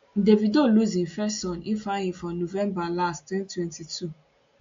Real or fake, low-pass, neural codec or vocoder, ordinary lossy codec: real; 7.2 kHz; none; AAC, 48 kbps